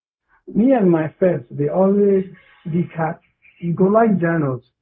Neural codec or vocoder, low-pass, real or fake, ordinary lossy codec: codec, 16 kHz, 0.4 kbps, LongCat-Audio-Codec; none; fake; none